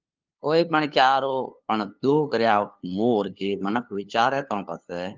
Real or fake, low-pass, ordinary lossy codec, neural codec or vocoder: fake; 7.2 kHz; Opus, 32 kbps; codec, 16 kHz, 2 kbps, FunCodec, trained on LibriTTS, 25 frames a second